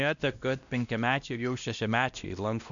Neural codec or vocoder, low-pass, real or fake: codec, 16 kHz, 1 kbps, X-Codec, WavLM features, trained on Multilingual LibriSpeech; 7.2 kHz; fake